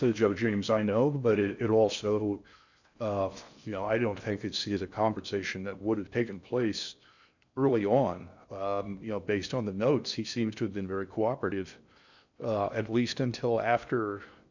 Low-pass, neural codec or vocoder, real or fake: 7.2 kHz; codec, 16 kHz in and 24 kHz out, 0.6 kbps, FocalCodec, streaming, 4096 codes; fake